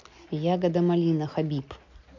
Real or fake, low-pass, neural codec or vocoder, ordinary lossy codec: real; 7.2 kHz; none; MP3, 48 kbps